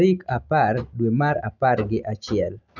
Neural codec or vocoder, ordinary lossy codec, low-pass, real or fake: none; none; 7.2 kHz; real